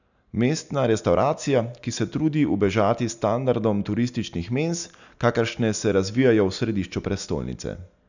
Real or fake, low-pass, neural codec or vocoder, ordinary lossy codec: real; 7.2 kHz; none; none